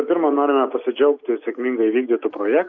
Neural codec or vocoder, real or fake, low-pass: none; real; 7.2 kHz